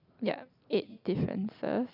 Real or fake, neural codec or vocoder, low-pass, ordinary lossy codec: real; none; 5.4 kHz; none